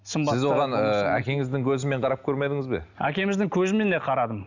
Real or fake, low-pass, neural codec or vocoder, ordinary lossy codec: real; 7.2 kHz; none; none